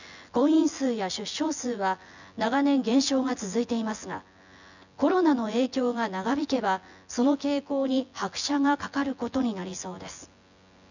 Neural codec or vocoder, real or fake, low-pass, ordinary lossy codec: vocoder, 24 kHz, 100 mel bands, Vocos; fake; 7.2 kHz; none